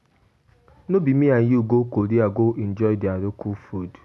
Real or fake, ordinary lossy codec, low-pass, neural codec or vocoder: real; none; none; none